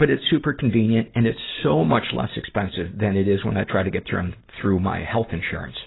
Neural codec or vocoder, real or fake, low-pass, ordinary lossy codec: none; real; 7.2 kHz; AAC, 16 kbps